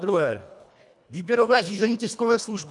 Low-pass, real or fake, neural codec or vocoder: 10.8 kHz; fake; codec, 24 kHz, 1.5 kbps, HILCodec